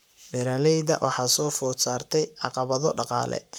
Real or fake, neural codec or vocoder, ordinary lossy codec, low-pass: fake; codec, 44.1 kHz, 7.8 kbps, Pupu-Codec; none; none